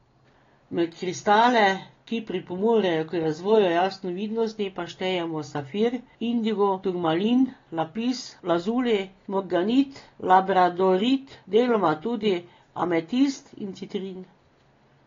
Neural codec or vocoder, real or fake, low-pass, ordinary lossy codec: none; real; 7.2 kHz; AAC, 32 kbps